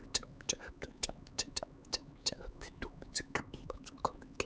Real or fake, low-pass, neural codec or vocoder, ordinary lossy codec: fake; none; codec, 16 kHz, 4 kbps, X-Codec, HuBERT features, trained on LibriSpeech; none